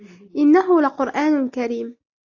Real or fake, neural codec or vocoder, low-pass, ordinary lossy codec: real; none; 7.2 kHz; AAC, 48 kbps